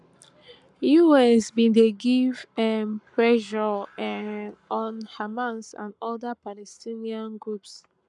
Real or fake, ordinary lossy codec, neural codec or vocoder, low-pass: fake; none; codec, 44.1 kHz, 7.8 kbps, Pupu-Codec; 10.8 kHz